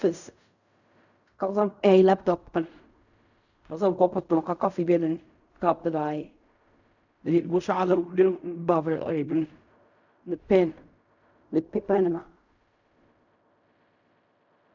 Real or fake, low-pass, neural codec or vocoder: fake; 7.2 kHz; codec, 16 kHz in and 24 kHz out, 0.4 kbps, LongCat-Audio-Codec, fine tuned four codebook decoder